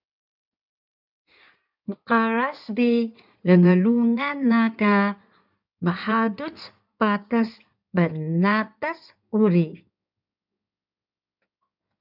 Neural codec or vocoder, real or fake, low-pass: codec, 16 kHz in and 24 kHz out, 2.2 kbps, FireRedTTS-2 codec; fake; 5.4 kHz